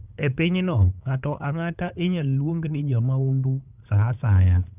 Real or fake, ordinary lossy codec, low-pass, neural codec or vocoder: fake; none; 3.6 kHz; codec, 16 kHz, 8 kbps, FunCodec, trained on LibriTTS, 25 frames a second